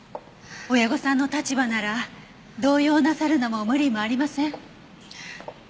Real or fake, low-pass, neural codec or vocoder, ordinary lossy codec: real; none; none; none